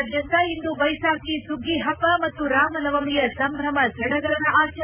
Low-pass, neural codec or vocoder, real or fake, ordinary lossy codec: 3.6 kHz; none; real; none